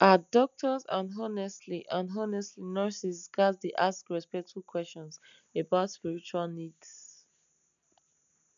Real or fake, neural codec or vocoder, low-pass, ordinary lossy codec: real; none; 7.2 kHz; MP3, 96 kbps